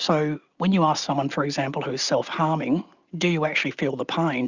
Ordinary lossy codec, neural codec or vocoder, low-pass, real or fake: Opus, 64 kbps; none; 7.2 kHz; real